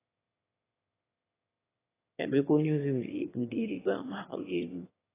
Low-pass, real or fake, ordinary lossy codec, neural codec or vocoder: 3.6 kHz; fake; AAC, 16 kbps; autoencoder, 22.05 kHz, a latent of 192 numbers a frame, VITS, trained on one speaker